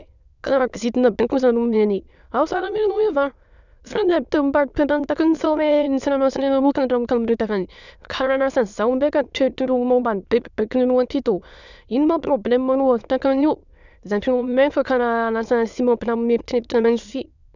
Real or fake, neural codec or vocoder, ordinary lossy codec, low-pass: fake; autoencoder, 22.05 kHz, a latent of 192 numbers a frame, VITS, trained on many speakers; none; 7.2 kHz